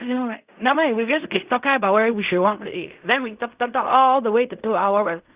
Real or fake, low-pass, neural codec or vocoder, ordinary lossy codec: fake; 3.6 kHz; codec, 16 kHz in and 24 kHz out, 0.4 kbps, LongCat-Audio-Codec, fine tuned four codebook decoder; Opus, 64 kbps